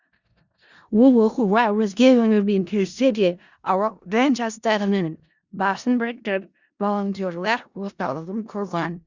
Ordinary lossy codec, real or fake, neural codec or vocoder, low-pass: Opus, 64 kbps; fake; codec, 16 kHz in and 24 kHz out, 0.4 kbps, LongCat-Audio-Codec, four codebook decoder; 7.2 kHz